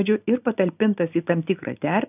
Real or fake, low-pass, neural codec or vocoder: real; 3.6 kHz; none